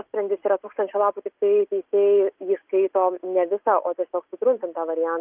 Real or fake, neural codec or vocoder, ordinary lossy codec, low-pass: real; none; Opus, 32 kbps; 3.6 kHz